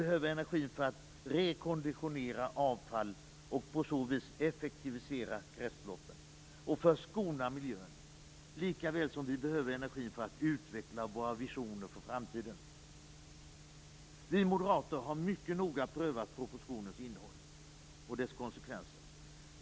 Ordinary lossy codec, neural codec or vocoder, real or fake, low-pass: none; none; real; none